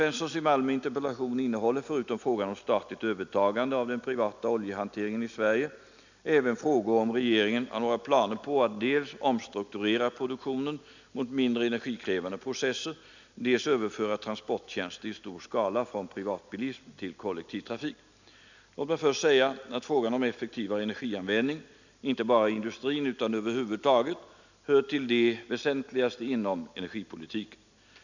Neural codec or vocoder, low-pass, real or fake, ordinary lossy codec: none; 7.2 kHz; real; none